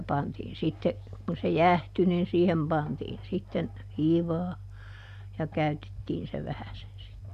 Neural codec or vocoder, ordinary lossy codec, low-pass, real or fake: none; none; 14.4 kHz; real